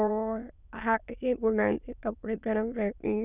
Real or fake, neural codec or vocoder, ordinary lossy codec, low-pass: fake; autoencoder, 22.05 kHz, a latent of 192 numbers a frame, VITS, trained on many speakers; none; 3.6 kHz